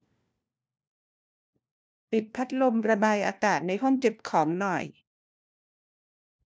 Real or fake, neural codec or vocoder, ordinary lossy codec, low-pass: fake; codec, 16 kHz, 1 kbps, FunCodec, trained on LibriTTS, 50 frames a second; none; none